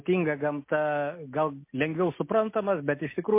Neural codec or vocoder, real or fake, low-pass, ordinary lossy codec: none; real; 3.6 kHz; MP3, 24 kbps